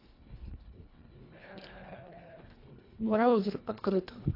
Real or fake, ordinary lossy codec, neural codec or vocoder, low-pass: fake; AAC, 32 kbps; codec, 24 kHz, 1.5 kbps, HILCodec; 5.4 kHz